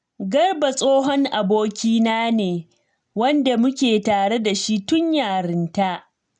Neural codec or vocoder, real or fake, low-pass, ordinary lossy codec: none; real; 9.9 kHz; none